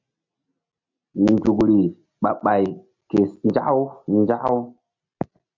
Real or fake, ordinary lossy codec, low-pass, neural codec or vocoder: real; MP3, 64 kbps; 7.2 kHz; none